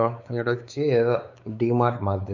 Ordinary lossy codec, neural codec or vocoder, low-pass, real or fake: none; codec, 16 kHz, 4 kbps, X-Codec, WavLM features, trained on Multilingual LibriSpeech; 7.2 kHz; fake